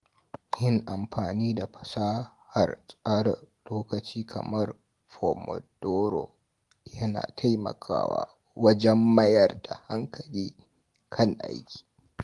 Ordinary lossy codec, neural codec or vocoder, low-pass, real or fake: Opus, 24 kbps; none; 10.8 kHz; real